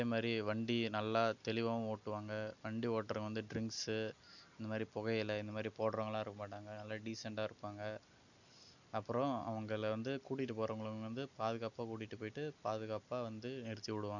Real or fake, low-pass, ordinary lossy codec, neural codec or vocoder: real; 7.2 kHz; none; none